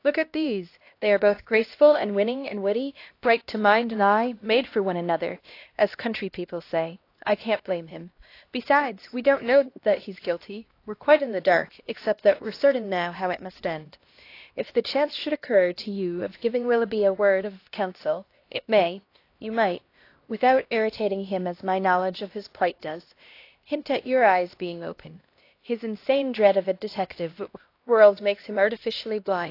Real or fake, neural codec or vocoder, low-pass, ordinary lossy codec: fake; codec, 16 kHz, 1 kbps, X-Codec, HuBERT features, trained on LibriSpeech; 5.4 kHz; AAC, 32 kbps